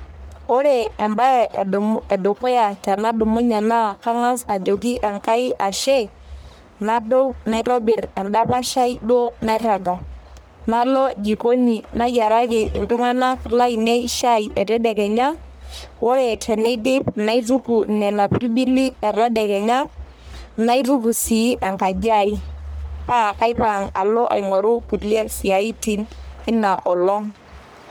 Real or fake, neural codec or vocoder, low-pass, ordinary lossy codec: fake; codec, 44.1 kHz, 1.7 kbps, Pupu-Codec; none; none